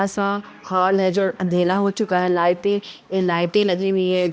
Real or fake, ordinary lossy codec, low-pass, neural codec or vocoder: fake; none; none; codec, 16 kHz, 1 kbps, X-Codec, HuBERT features, trained on balanced general audio